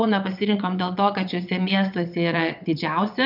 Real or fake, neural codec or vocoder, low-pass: fake; vocoder, 22.05 kHz, 80 mel bands, WaveNeXt; 5.4 kHz